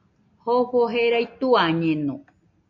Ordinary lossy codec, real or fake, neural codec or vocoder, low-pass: MP3, 48 kbps; real; none; 7.2 kHz